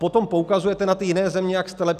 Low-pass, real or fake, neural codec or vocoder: 14.4 kHz; real; none